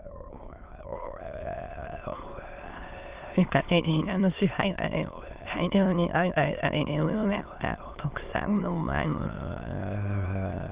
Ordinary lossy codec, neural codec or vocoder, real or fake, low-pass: Opus, 32 kbps; autoencoder, 22.05 kHz, a latent of 192 numbers a frame, VITS, trained on many speakers; fake; 3.6 kHz